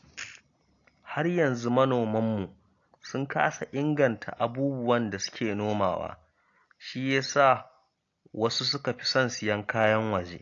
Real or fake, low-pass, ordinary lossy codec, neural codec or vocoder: real; 7.2 kHz; AAC, 48 kbps; none